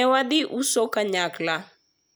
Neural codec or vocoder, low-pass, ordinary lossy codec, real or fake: none; none; none; real